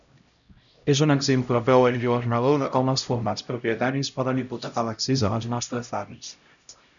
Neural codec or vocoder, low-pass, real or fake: codec, 16 kHz, 0.5 kbps, X-Codec, HuBERT features, trained on LibriSpeech; 7.2 kHz; fake